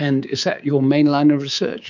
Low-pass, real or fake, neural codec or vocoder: 7.2 kHz; fake; codec, 24 kHz, 3.1 kbps, DualCodec